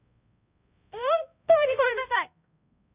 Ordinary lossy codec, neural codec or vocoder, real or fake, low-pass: Opus, 64 kbps; codec, 16 kHz, 0.5 kbps, X-Codec, HuBERT features, trained on balanced general audio; fake; 3.6 kHz